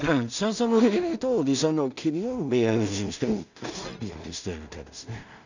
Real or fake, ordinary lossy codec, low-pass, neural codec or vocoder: fake; none; 7.2 kHz; codec, 16 kHz in and 24 kHz out, 0.4 kbps, LongCat-Audio-Codec, two codebook decoder